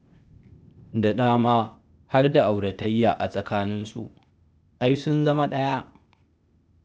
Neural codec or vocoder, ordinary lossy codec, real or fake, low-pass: codec, 16 kHz, 0.8 kbps, ZipCodec; none; fake; none